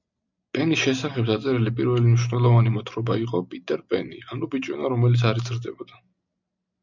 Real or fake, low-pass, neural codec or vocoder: real; 7.2 kHz; none